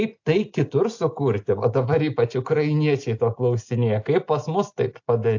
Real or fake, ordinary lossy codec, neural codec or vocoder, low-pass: real; AAC, 48 kbps; none; 7.2 kHz